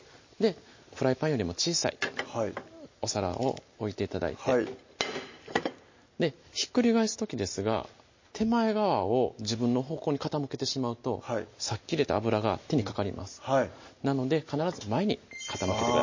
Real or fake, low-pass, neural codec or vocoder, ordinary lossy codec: real; 7.2 kHz; none; MP3, 32 kbps